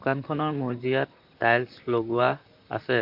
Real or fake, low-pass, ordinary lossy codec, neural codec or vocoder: fake; 5.4 kHz; MP3, 48 kbps; vocoder, 44.1 kHz, 128 mel bands, Pupu-Vocoder